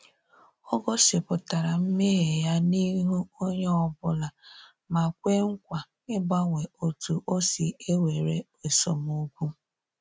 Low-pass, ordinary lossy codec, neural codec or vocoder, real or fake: none; none; none; real